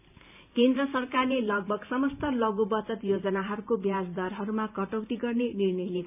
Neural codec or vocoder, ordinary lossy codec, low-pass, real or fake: vocoder, 44.1 kHz, 128 mel bands every 512 samples, BigVGAN v2; none; 3.6 kHz; fake